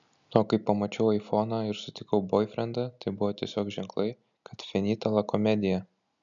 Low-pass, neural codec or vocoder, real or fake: 7.2 kHz; none; real